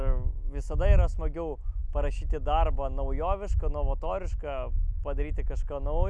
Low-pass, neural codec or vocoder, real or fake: 9.9 kHz; none; real